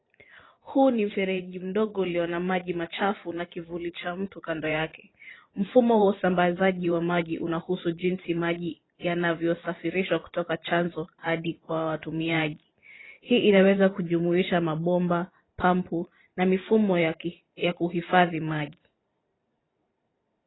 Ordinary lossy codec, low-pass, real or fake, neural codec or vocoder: AAC, 16 kbps; 7.2 kHz; fake; vocoder, 44.1 kHz, 128 mel bands every 512 samples, BigVGAN v2